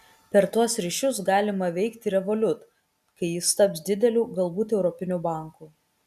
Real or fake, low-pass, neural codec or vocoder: real; 14.4 kHz; none